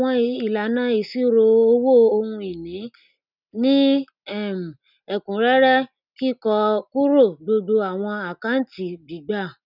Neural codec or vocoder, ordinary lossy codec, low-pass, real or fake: none; none; 5.4 kHz; real